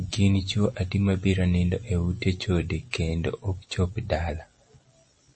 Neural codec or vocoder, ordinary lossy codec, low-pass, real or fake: none; MP3, 32 kbps; 9.9 kHz; real